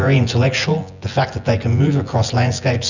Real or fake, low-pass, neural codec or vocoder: fake; 7.2 kHz; vocoder, 24 kHz, 100 mel bands, Vocos